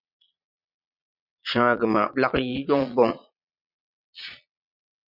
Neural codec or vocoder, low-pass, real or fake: vocoder, 22.05 kHz, 80 mel bands, Vocos; 5.4 kHz; fake